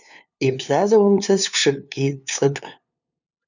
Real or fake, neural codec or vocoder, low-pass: fake; codec, 16 kHz, 2 kbps, FunCodec, trained on LibriTTS, 25 frames a second; 7.2 kHz